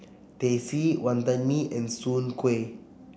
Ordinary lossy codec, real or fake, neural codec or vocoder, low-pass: none; real; none; none